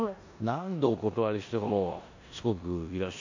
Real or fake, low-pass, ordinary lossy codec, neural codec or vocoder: fake; 7.2 kHz; AAC, 48 kbps; codec, 16 kHz in and 24 kHz out, 0.9 kbps, LongCat-Audio-Codec, four codebook decoder